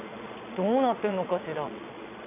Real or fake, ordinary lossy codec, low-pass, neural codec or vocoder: fake; none; 3.6 kHz; vocoder, 22.05 kHz, 80 mel bands, Vocos